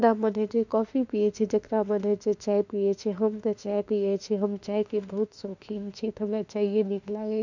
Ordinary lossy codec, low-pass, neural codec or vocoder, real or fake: none; 7.2 kHz; autoencoder, 48 kHz, 32 numbers a frame, DAC-VAE, trained on Japanese speech; fake